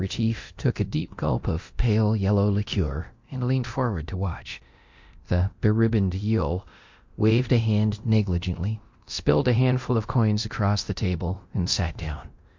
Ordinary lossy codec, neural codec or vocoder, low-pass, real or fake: MP3, 48 kbps; codec, 24 kHz, 0.9 kbps, DualCodec; 7.2 kHz; fake